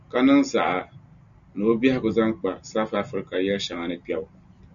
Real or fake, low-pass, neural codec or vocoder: real; 7.2 kHz; none